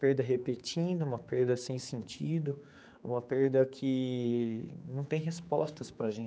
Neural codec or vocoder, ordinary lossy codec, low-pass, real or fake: codec, 16 kHz, 4 kbps, X-Codec, HuBERT features, trained on general audio; none; none; fake